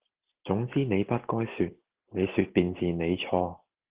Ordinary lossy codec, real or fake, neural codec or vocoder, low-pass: Opus, 16 kbps; real; none; 3.6 kHz